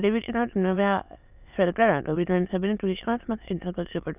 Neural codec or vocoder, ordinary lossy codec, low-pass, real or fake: autoencoder, 22.05 kHz, a latent of 192 numbers a frame, VITS, trained on many speakers; none; 3.6 kHz; fake